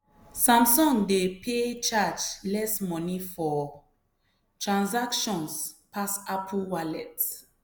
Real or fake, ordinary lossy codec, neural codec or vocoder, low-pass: real; none; none; none